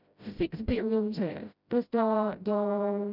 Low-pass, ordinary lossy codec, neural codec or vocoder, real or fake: 5.4 kHz; none; codec, 16 kHz, 0.5 kbps, FreqCodec, smaller model; fake